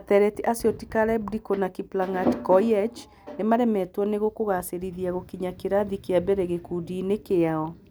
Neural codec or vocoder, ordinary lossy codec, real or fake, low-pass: none; none; real; none